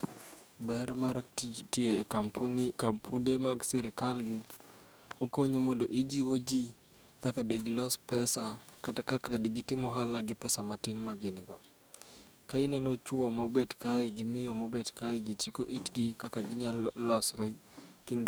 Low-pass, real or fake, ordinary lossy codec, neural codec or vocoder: none; fake; none; codec, 44.1 kHz, 2.6 kbps, DAC